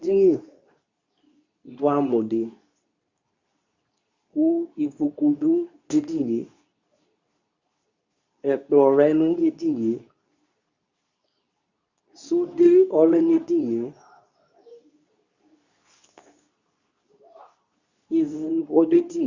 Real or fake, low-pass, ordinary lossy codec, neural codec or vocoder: fake; 7.2 kHz; Opus, 64 kbps; codec, 24 kHz, 0.9 kbps, WavTokenizer, medium speech release version 2